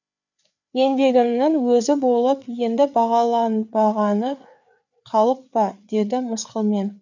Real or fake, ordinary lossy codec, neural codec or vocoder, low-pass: fake; none; codec, 16 kHz, 4 kbps, FreqCodec, larger model; 7.2 kHz